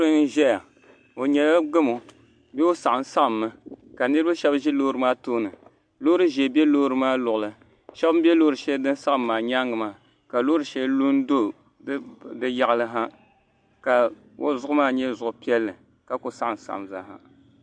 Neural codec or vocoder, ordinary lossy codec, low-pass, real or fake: none; MP3, 64 kbps; 9.9 kHz; real